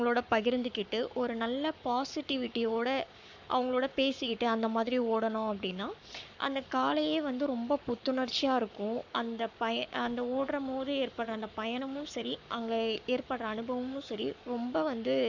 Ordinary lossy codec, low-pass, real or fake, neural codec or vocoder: none; 7.2 kHz; fake; codec, 44.1 kHz, 7.8 kbps, Pupu-Codec